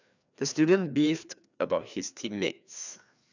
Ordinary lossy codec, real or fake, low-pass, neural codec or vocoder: none; fake; 7.2 kHz; codec, 16 kHz, 2 kbps, FreqCodec, larger model